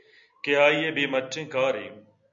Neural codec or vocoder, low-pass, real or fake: none; 7.2 kHz; real